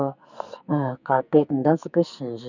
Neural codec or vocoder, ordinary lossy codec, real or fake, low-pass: codec, 44.1 kHz, 2.6 kbps, SNAC; none; fake; 7.2 kHz